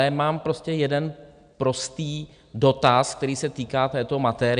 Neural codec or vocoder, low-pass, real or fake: none; 9.9 kHz; real